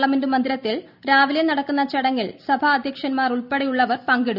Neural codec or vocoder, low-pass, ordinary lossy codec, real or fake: none; 5.4 kHz; none; real